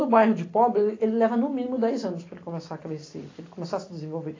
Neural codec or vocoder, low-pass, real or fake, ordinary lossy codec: none; 7.2 kHz; real; AAC, 32 kbps